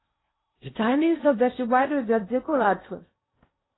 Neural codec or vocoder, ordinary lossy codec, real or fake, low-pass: codec, 16 kHz in and 24 kHz out, 0.8 kbps, FocalCodec, streaming, 65536 codes; AAC, 16 kbps; fake; 7.2 kHz